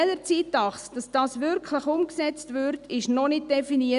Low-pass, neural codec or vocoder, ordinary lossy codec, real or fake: 10.8 kHz; none; none; real